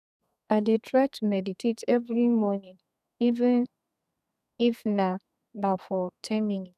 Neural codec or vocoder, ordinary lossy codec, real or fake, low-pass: codec, 32 kHz, 1.9 kbps, SNAC; none; fake; 14.4 kHz